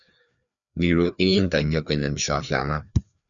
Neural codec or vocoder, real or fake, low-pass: codec, 16 kHz, 2 kbps, FreqCodec, larger model; fake; 7.2 kHz